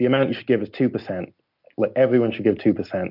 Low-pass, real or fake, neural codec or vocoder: 5.4 kHz; real; none